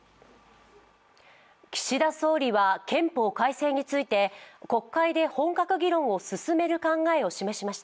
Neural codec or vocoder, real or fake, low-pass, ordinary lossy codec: none; real; none; none